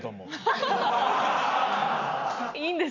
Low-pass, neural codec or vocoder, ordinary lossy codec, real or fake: 7.2 kHz; none; none; real